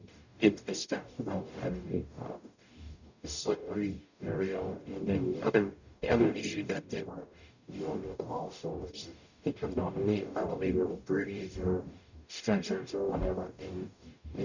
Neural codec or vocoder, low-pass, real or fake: codec, 44.1 kHz, 0.9 kbps, DAC; 7.2 kHz; fake